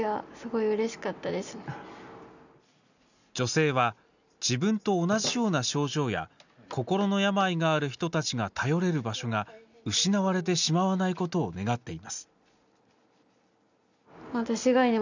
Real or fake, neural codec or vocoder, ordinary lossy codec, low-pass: real; none; none; 7.2 kHz